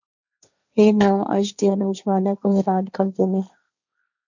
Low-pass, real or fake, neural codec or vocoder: 7.2 kHz; fake; codec, 16 kHz, 1.1 kbps, Voila-Tokenizer